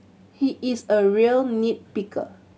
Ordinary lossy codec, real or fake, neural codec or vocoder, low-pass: none; real; none; none